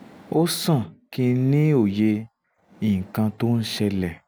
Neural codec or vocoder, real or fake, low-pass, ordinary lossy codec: none; real; none; none